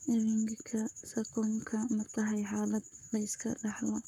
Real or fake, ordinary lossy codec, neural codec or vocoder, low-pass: fake; none; codec, 44.1 kHz, 7.8 kbps, Pupu-Codec; 19.8 kHz